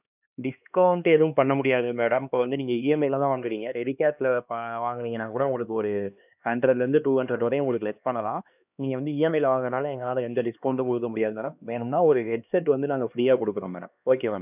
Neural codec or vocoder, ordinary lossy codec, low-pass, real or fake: codec, 16 kHz, 2 kbps, X-Codec, HuBERT features, trained on LibriSpeech; none; 3.6 kHz; fake